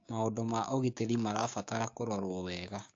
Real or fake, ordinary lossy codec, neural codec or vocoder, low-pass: fake; AAC, 48 kbps; codec, 16 kHz, 6 kbps, DAC; 7.2 kHz